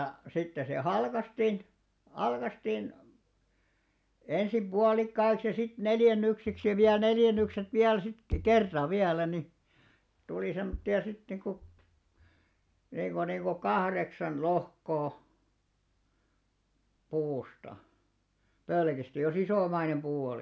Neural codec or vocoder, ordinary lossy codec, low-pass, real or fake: none; none; none; real